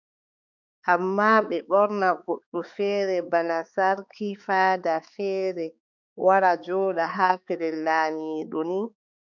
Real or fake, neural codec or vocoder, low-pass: fake; codec, 16 kHz, 4 kbps, X-Codec, HuBERT features, trained on balanced general audio; 7.2 kHz